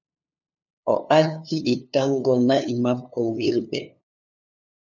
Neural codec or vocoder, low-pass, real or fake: codec, 16 kHz, 2 kbps, FunCodec, trained on LibriTTS, 25 frames a second; 7.2 kHz; fake